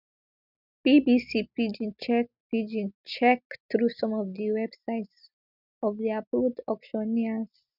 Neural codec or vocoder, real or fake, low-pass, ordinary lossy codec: none; real; 5.4 kHz; none